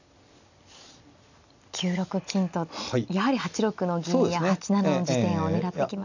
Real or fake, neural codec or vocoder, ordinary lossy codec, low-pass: real; none; none; 7.2 kHz